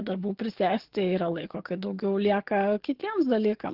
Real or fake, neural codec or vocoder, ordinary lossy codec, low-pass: fake; codec, 44.1 kHz, 7.8 kbps, DAC; Opus, 16 kbps; 5.4 kHz